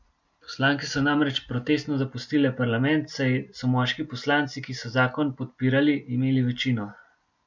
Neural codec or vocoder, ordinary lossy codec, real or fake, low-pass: none; MP3, 64 kbps; real; 7.2 kHz